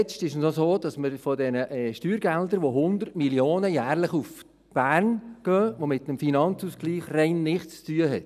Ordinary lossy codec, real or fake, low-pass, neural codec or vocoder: none; real; 14.4 kHz; none